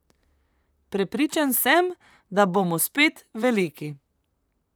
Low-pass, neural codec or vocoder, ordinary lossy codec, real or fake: none; vocoder, 44.1 kHz, 128 mel bands, Pupu-Vocoder; none; fake